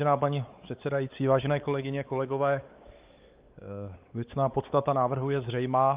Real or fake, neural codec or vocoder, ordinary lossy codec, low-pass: fake; codec, 16 kHz, 4 kbps, X-Codec, WavLM features, trained on Multilingual LibriSpeech; Opus, 24 kbps; 3.6 kHz